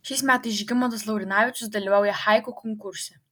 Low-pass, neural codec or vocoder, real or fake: 19.8 kHz; none; real